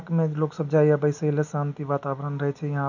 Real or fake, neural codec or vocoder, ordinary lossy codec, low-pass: real; none; none; 7.2 kHz